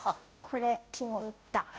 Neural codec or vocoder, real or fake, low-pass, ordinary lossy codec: codec, 16 kHz, 0.5 kbps, FunCodec, trained on Chinese and English, 25 frames a second; fake; none; none